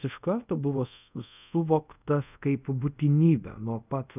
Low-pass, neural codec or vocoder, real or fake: 3.6 kHz; codec, 24 kHz, 0.5 kbps, DualCodec; fake